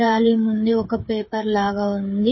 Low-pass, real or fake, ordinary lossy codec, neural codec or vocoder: 7.2 kHz; fake; MP3, 24 kbps; codec, 16 kHz, 8 kbps, FreqCodec, smaller model